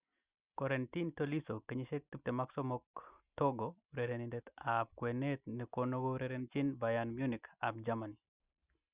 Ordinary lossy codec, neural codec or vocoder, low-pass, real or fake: Opus, 64 kbps; none; 3.6 kHz; real